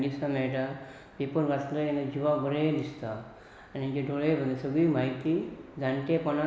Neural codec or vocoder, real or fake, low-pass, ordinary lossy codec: none; real; none; none